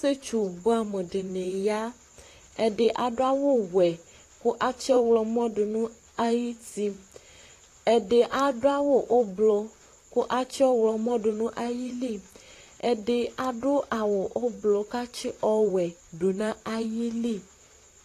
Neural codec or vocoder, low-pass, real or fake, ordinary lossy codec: vocoder, 44.1 kHz, 128 mel bands, Pupu-Vocoder; 14.4 kHz; fake; AAC, 48 kbps